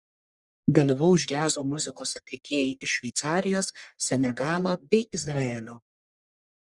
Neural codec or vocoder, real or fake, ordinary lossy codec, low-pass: codec, 44.1 kHz, 1.7 kbps, Pupu-Codec; fake; Opus, 64 kbps; 10.8 kHz